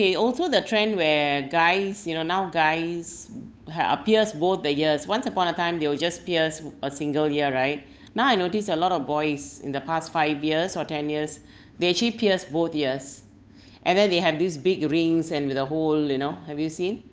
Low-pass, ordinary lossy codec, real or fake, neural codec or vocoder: none; none; fake; codec, 16 kHz, 8 kbps, FunCodec, trained on Chinese and English, 25 frames a second